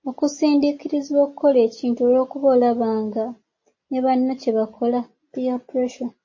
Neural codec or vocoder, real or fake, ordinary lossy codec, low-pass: none; real; MP3, 32 kbps; 7.2 kHz